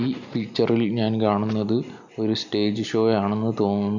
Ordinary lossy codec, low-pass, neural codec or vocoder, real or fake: none; 7.2 kHz; none; real